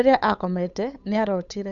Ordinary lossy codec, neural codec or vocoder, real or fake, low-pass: none; codec, 16 kHz, 8 kbps, FunCodec, trained on Chinese and English, 25 frames a second; fake; 7.2 kHz